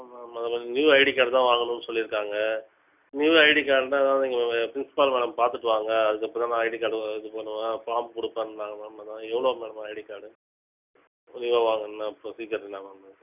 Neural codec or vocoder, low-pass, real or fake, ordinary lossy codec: none; 3.6 kHz; real; none